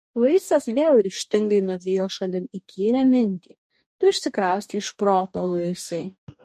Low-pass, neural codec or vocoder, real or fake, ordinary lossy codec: 14.4 kHz; codec, 44.1 kHz, 2.6 kbps, DAC; fake; MP3, 64 kbps